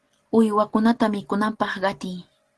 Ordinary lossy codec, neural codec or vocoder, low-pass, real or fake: Opus, 16 kbps; none; 10.8 kHz; real